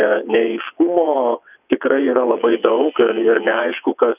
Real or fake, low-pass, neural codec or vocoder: fake; 3.6 kHz; vocoder, 22.05 kHz, 80 mel bands, WaveNeXt